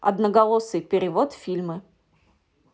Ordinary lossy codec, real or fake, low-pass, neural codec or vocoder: none; real; none; none